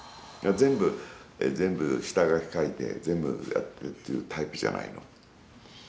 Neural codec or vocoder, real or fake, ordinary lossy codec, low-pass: none; real; none; none